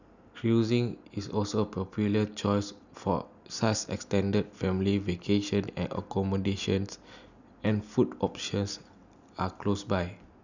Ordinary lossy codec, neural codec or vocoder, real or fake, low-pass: none; none; real; 7.2 kHz